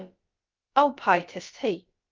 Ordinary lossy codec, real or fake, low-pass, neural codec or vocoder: Opus, 24 kbps; fake; 7.2 kHz; codec, 16 kHz, about 1 kbps, DyCAST, with the encoder's durations